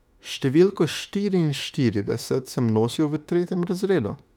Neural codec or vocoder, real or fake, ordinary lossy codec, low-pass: autoencoder, 48 kHz, 32 numbers a frame, DAC-VAE, trained on Japanese speech; fake; none; 19.8 kHz